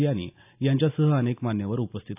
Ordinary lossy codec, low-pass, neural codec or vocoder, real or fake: AAC, 32 kbps; 3.6 kHz; none; real